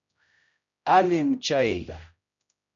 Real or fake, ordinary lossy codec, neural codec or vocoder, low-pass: fake; MP3, 96 kbps; codec, 16 kHz, 0.5 kbps, X-Codec, HuBERT features, trained on general audio; 7.2 kHz